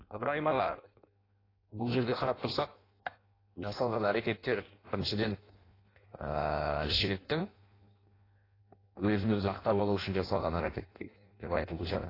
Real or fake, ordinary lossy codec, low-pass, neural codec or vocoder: fake; AAC, 24 kbps; 5.4 kHz; codec, 16 kHz in and 24 kHz out, 0.6 kbps, FireRedTTS-2 codec